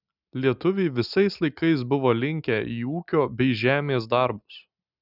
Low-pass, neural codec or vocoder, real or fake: 5.4 kHz; none; real